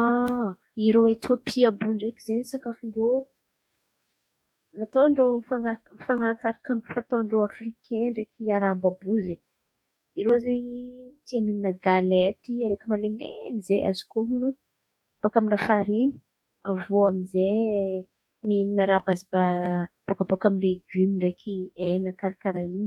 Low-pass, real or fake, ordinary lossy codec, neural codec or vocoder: 19.8 kHz; fake; none; codec, 44.1 kHz, 2.6 kbps, DAC